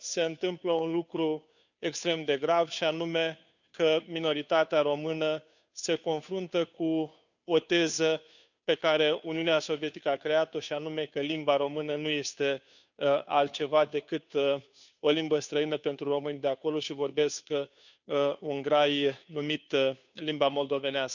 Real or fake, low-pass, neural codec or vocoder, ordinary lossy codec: fake; 7.2 kHz; codec, 16 kHz, 2 kbps, FunCodec, trained on Chinese and English, 25 frames a second; none